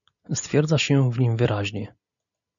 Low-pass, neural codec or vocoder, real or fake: 7.2 kHz; none; real